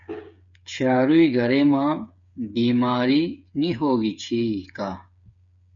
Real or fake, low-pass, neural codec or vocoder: fake; 7.2 kHz; codec, 16 kHz, 8 kbps, FreqCodec, smaller model